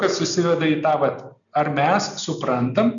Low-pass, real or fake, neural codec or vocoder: 7.2 kHz; real; none